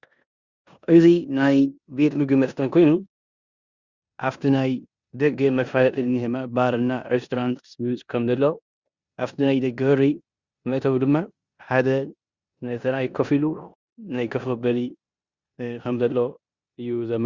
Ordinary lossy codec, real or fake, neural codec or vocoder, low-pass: Opus, 64 kbps; fake; codec, 16 kHz in and 24 kHz out, 0.9 kbps, LongCat-Audio-Codec, four codebook decoder; 7.2 kHz